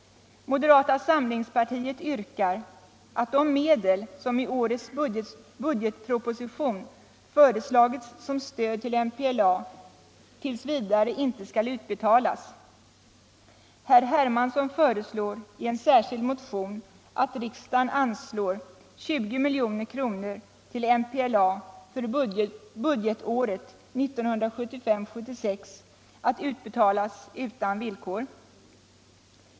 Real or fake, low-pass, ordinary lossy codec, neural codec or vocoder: real; none; none; none